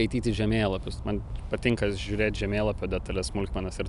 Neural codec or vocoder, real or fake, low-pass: none; real; 10.8 kHz